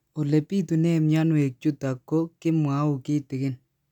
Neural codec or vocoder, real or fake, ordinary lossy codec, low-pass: none; real; none; 19.8 kHz